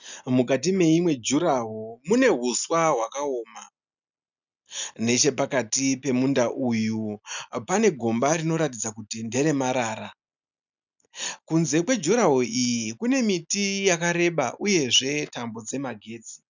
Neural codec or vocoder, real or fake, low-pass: none; real; 7.2 kHz